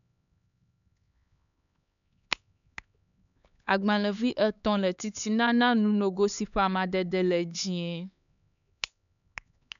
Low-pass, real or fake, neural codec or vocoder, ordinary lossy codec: 7.2 kHz; fake; codec, 16 kHz, 4 kbps, X-Codec, HuBERT features, trained on LibriSpeech; none